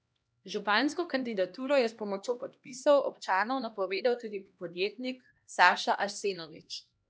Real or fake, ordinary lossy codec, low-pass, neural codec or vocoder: fake; none; none; codec, 16 kHz, 1 kbps, X-Codec, HuBERT features, trained on LibriSpeech